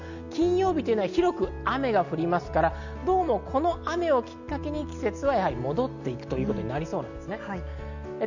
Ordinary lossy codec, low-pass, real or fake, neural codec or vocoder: none; 7.2 kHz; real; none